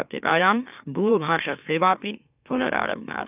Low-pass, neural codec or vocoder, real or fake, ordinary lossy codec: 3.6 kHz; autoencoder, 44.1 kHz, a latent of 192 numbers a frame, MeloTTS; fake; none